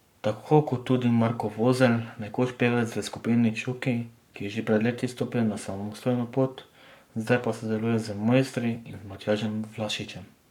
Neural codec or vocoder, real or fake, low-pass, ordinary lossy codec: codec, 44.1 kHz, 7.8 kbps, Pupu-Codec; fake; 19.8 kHz; none